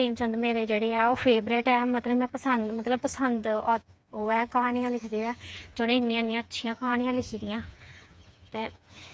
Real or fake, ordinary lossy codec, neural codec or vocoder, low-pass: fake; none; codec, 16 kHz, 4 kbps, FreqCodec, smaller model; none